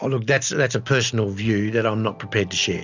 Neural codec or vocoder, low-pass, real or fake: none; 7.2 kHz; real